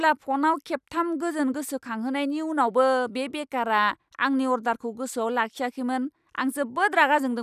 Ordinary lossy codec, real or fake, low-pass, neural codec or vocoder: none; real; 14.4 kHz; none